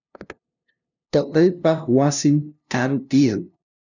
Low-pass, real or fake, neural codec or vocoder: 7.2 kHz; fake; codec, 16 kHz, 0.5 kbps, FunCodec, trained on LibriTTS, 25 frames a second